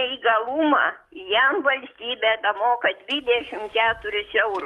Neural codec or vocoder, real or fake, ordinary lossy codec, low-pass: codec, 44.1 kHz, 7.8 kbps, DAC; fake; Opus, 32 kbps; 19.8 kHz